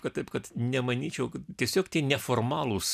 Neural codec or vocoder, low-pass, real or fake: none; 14.4 kHz; real